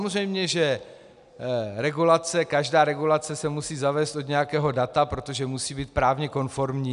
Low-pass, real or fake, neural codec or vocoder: 10.8 kHz; real; none